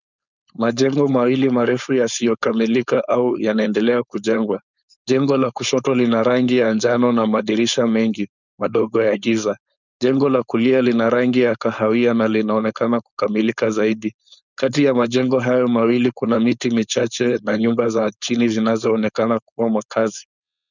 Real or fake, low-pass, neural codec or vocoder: fake; 7.2 kHz; codec, 16 kHz, 4.8 kbps, FACodec